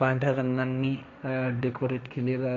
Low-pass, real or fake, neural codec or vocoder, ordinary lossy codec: none; fake; codec, 16 kHz, 1.1 kbps, Voila-Tokenizer; none